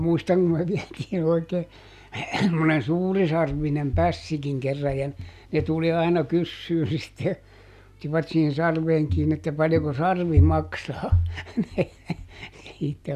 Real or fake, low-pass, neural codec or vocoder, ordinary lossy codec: real; 14.4 kHz; none; none